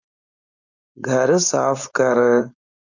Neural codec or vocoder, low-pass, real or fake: codec, 16 kHz, 4.8 kbps, FACodec; 7.2 kHz; fake